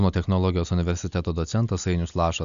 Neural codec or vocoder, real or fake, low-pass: none; real; 7.2 kHz